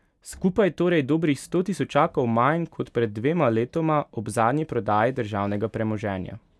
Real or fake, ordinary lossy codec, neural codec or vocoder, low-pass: real; none; none; none